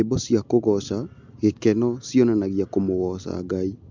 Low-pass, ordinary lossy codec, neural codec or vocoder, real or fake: 7.2 kHz; MP3, 64 kbps; none; real